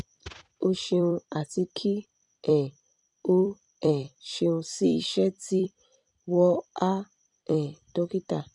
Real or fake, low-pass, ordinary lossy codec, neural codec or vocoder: fake; 10.8 kHz; none; vocoder, 44.1 kHz, 128 mel bands every 256 samples, BigVGAN v2